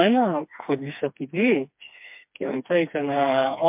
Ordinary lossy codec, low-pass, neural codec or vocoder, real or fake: MP3, 32 kbps; 3.6 kHz; codec, 16 kHz, 2 kbps, FreqCodec, smaller model; fake